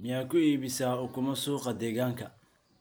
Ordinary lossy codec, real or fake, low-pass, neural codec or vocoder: none; real; none; none